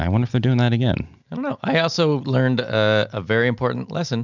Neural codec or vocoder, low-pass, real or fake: none; 7.2 kHz; real